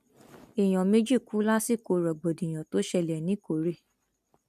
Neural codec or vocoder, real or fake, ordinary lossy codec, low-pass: none; real; none; 14.4 kHz